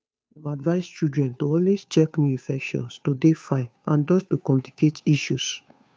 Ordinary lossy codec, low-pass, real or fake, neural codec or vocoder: none; none; fake; codec, 16 kHz, 2 kbps, FunCodec, trained on Chinese and English, 25 frames a second